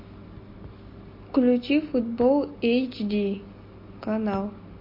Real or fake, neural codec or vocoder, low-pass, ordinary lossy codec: real; none; 5.4 kHz; MP3, 32 kbps